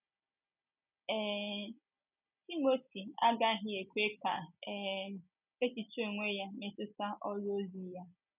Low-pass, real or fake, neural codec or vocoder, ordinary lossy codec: 3.6 kHz; real; none; none